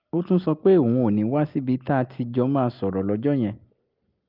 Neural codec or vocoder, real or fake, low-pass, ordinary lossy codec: none; real; 5.4 kHz; Opus, 32 kbps